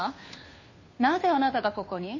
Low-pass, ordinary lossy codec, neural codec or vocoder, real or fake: 7.2 kHz; MP3, 32 kbps; codec, 16 kHz, 2 kbps, FunCodec, trained on Chinese and English, 25 frames a second; fake